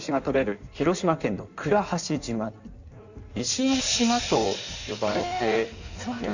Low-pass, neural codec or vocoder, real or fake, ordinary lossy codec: 7.2 kHz; codec, 16 kHz in and 24 kHz out, 1.1 kbps, FireRedTTS-2 codec; fake; none